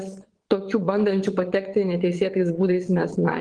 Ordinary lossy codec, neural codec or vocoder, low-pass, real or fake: Opus, 16 kbps; vocoder, 22.05 kHz, 80 mel bands, WaveNeXt; 9.9 kHz; fake